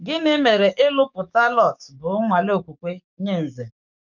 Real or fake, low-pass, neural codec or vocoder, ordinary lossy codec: fake; 7.2 kHz; codec, 44.1 kHz, 7.8 kbps, DAC; none